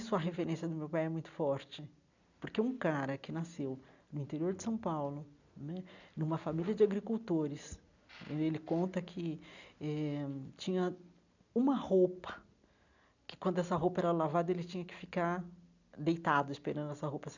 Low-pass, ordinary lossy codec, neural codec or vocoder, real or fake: 7.2 kHz; none; none; real